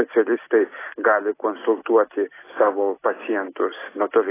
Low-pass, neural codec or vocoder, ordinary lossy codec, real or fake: 3.6 kHz; none; AAC, 16 kbps; real